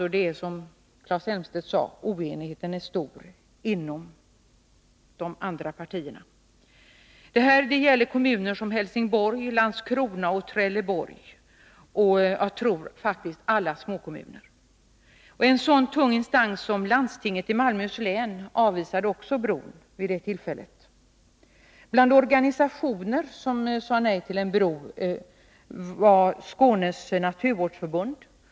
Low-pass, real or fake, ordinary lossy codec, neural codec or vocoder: none; real; none; none